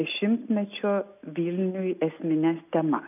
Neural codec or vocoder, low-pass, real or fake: none; 3.6 kHz; real